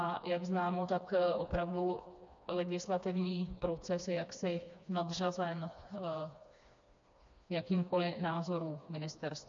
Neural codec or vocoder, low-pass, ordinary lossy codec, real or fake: codec, 16 kHz, 2 kbps, FreqCodec, smaller model; 7.2 kHz; MP3, 64 kbps; fake